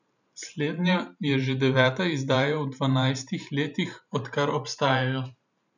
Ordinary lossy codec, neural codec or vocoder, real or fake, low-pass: none; vocoder, 44.1 kHz, 128 mel bands every 512 samples, BigVGAN v2; fake; 7.2 kHz